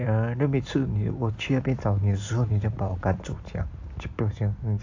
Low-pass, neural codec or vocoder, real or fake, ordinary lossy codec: 7.2 kHz; vocoder, 44.1 kHz, 128 mel bands every 256 samples, BigVGAN v2; fake; AAC, 48 kbps